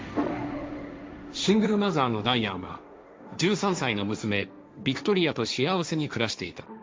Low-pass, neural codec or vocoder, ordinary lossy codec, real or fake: none; codec, 16 kHz, 1.1 kbps, Voila-Tokenizer; none; fake